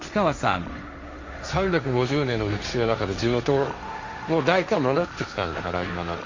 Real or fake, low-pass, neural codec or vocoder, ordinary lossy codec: fake; 7.2 kHz; codec, 16 kHz, 1.1 kbps, Voila-Tokenizer; MP3, 48 kbps